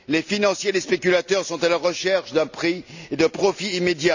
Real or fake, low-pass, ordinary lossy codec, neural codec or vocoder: real; 7.2 kHz; none; none